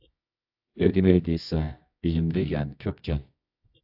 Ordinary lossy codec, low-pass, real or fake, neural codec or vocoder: AAC, 48 kbps; 5.4 kHz; fake; codec, 24 kHz, 0.9 kbps, WavTokenizer, medium music audio release